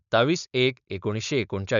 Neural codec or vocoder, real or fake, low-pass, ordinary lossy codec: codec, 16 kHz, 4.8 kbps, FACodec; fake; 7.2 kHz; none